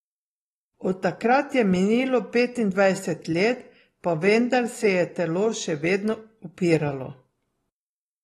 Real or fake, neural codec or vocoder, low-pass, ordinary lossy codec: real; none; 19.8 kHz; AAC, 32 kbps